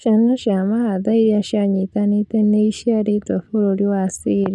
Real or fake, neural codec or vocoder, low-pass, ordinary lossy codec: real; none; none; none